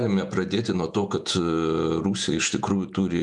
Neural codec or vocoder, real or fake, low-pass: vocoder, 48 kHz, 128 mel bands, Vocos; fake; 10.8 kHz